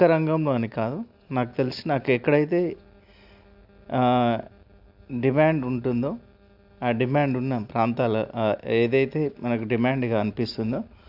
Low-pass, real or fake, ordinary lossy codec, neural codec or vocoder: 5.4 kHz; real; AAC, 48 kbps; none